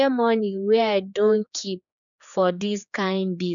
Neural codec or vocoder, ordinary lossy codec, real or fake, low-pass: codec, 16 kHz, 4 kbps, X-Codec, HuBERT features, trained on general audio; none; fake; 7.2 kHz